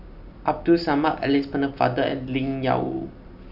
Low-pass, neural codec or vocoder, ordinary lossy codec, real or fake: 5.4 kHz; none; none; real